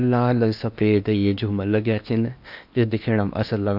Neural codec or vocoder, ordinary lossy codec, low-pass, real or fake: codec, 16 kHz in and 24 kHz out, 0.8 kbps, FocalCodec, streaming, 65536 codes; none; 5.4 kHz; fake